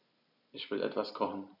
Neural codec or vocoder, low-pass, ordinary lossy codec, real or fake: none; 5.4 kHz; none; real